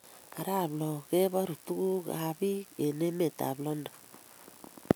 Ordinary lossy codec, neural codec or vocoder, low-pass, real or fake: none; none; none; real